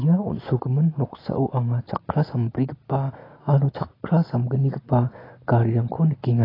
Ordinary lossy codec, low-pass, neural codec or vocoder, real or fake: AAC, 24 kbps; 5.4 kHz; none; real